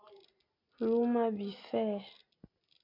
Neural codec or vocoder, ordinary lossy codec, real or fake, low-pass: none; MP3, 48 kbps; real; 5.4 kHz